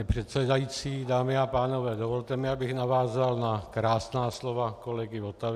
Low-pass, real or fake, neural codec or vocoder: 14.4 kHz; real; none